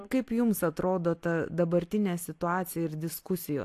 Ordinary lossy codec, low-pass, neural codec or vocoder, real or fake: AAC, 64 kbps; 14.4 kHz; none; real